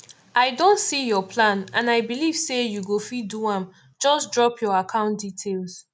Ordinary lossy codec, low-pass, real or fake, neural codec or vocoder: none; none; real; none